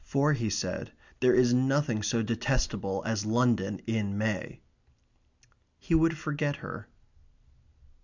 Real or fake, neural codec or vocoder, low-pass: real; none; 7.2 kHz